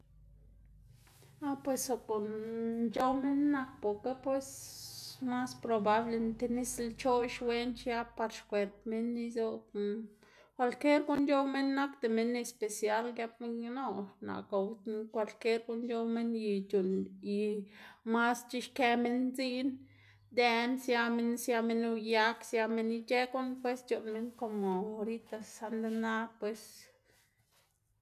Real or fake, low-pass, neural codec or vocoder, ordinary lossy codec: real; 14.4 kHz; none; none